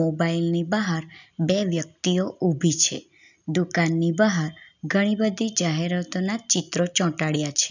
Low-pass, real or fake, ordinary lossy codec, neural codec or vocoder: 7.2 kHz; real; none; none